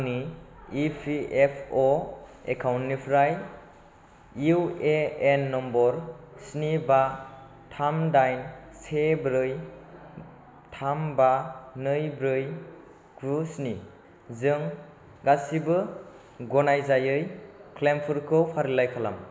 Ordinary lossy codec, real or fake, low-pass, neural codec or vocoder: none; real; none; none